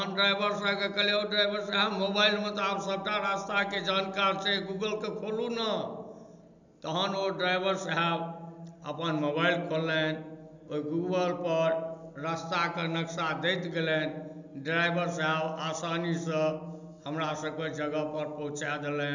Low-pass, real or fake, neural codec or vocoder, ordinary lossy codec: 7.2 kHz; real; none; none